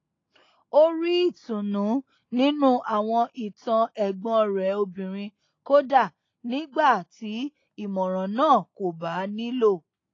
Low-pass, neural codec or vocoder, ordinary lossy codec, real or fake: 7.2 kHz; none; AAC, 32 kbps; real